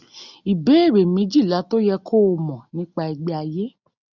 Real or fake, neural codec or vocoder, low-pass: real; none; 7.2 kHz